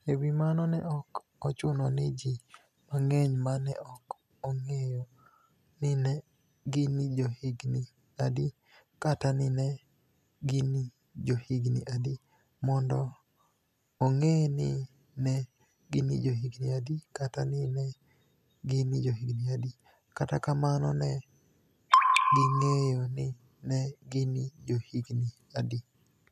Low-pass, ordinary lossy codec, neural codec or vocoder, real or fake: 14.4 kHz; none; none; real